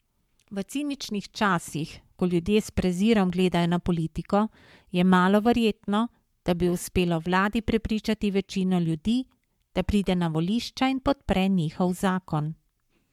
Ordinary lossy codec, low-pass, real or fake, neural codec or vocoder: MP3, 96 kbps; 19.8 kHz; fake; codec, 44.1 kHz, 7.8 kbps, Pupu-Codec